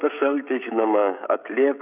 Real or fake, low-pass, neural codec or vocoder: fake; 3.6 kHz; codec, 16 kHz, 16 kbps, FreqCodec, smaller model